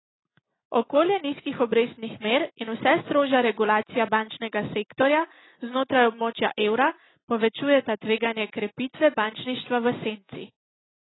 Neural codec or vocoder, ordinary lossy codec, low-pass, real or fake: none; AAC, 16 kbps; 7.2 kHz; real